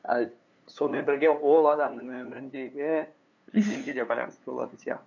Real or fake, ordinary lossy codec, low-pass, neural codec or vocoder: fake; none; 7.2 kHz; codec, 16 kHz, 2 kbps, FunCodec, trained on LibriTTS, 25 frames a second